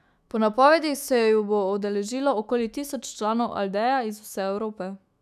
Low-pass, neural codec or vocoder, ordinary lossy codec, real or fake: 14.4 kHz; autoencoder, 48 kHz, 128 numbers a frame, DAC-VAE, trained on Japanese speech; none; fake